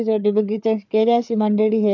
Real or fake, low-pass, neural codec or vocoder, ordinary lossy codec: fake; 7.2 kHz; codec, 16 kHz, 4 kbps, FreqCodec, larger model; none